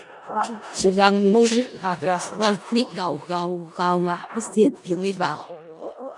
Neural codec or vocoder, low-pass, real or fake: codec, 16 kHz in and 24 kHz out, 0.4 kbps, LongCat-Audio-Codec, four codebook decoder; 10.8 kHz; fake